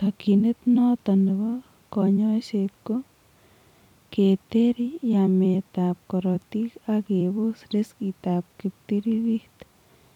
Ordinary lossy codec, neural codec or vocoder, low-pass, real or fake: none; vocoder, 44.1 kHz, 128 mel bands every 256 samples, BigVGAN v2; 19.8 kHz; fake